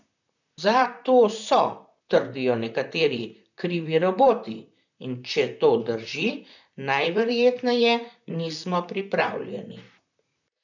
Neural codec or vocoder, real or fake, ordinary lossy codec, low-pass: vocoder, 44.1 kHz, 128 mel bands, Pupu-Vocoder; fake; none; 7.2 kHz